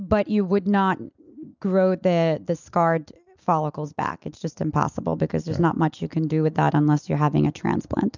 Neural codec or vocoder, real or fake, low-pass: none; real; 7.2 kHz